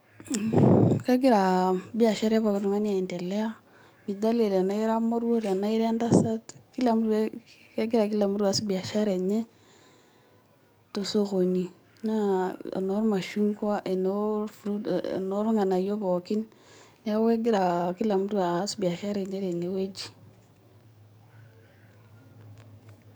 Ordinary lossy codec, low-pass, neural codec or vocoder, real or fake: none; none; codec, 44.1 kHz, 7.8 kbps, DAC; fake